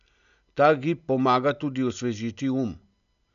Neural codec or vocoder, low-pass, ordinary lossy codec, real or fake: none; 7.2 kHz; none; real